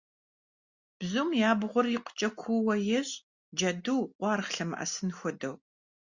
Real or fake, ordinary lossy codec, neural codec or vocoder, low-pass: real; Opus, 64 kbps; none; 7.2 kHz